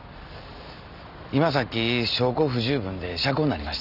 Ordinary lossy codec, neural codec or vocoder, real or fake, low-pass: none; none; real; 5.4 kHz